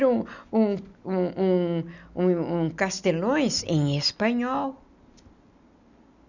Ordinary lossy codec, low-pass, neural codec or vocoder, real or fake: none; 7.2 kHz; none; real